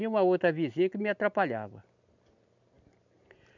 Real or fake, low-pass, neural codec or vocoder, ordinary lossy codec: real; 7.2 kHz; none; none